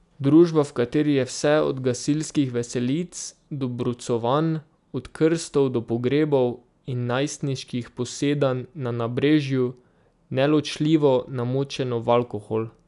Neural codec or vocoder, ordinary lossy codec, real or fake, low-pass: none; none; real; 10.8 kHz